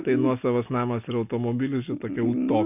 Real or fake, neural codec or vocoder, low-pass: real; none; 3.6 kHz